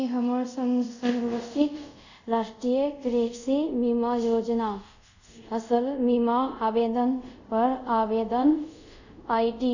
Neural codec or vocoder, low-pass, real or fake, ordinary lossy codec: codec, 24 kHz, 0.5 kbps, DualCodec; 7.2 kHz; fake; none